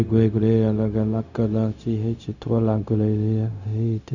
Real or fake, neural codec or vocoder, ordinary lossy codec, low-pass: fake; codec, 16 kHz, 0.4 kbps, LongCat-Audio-Codec; none; 7.2 kHz